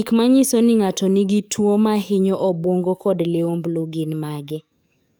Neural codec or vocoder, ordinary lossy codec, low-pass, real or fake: codec, 44.1 kHz, 7.8 kbps, DAC; none; none; fake